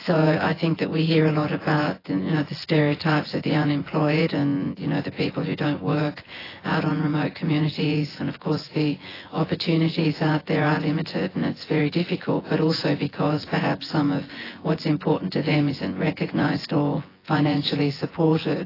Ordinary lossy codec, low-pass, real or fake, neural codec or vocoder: AAC, 24 kbps; 5.4 kHz; fake; vocoder, 24 kHz, 100 mel bands, Vocos